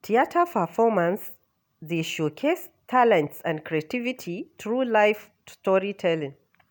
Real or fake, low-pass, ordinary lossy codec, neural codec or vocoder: real; none; none; none